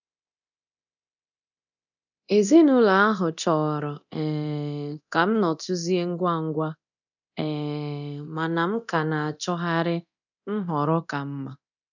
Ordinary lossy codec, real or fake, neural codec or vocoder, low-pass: none; fake; codec, 24 kHz, 0.9 kbps, DualCodec; 7.2 kHz